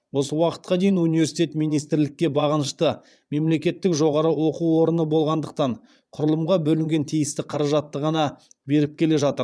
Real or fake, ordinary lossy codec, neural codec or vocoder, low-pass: fake; none; vocoder, 22.05 kHz, 80 mel bands, Vocos; none